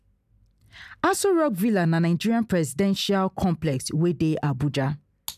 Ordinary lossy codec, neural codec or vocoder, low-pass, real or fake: none; none; 14.4 kHz; real